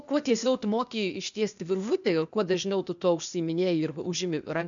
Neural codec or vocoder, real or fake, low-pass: codec, 16 kHz, 0.8 kbps, ZipCodec; fake; 7.2 kHz